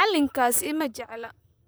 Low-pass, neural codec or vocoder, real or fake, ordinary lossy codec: none; vocoder, 44.1 kHz, 128 mel bands, Pupu-Vocoder; fake; none